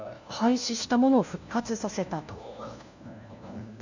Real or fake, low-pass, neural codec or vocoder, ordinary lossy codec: fake; 7.2 kHz; codec, 16 kHz, 1 kbps, FunCodec, trained on LibriTTS, 50 frames a second; none